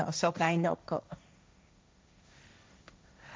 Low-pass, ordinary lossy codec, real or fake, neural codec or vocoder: none; none; fake; codec, 16 kHz, 1.1 kbps, Voila-Tokenizer